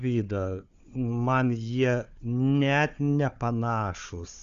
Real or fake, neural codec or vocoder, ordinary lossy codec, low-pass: fake; codec, 16 kHz, 4 kbps, FunCodec, trained on Chinese and English, 50 frames a second; Opus, 64 kbps; 7.2 kHz